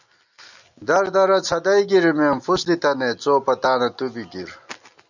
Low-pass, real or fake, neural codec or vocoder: 7.2 kHz; real; none